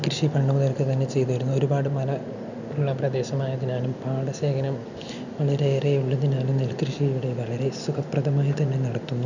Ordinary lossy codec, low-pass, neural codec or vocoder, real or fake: none; 7.2 kHz; none; real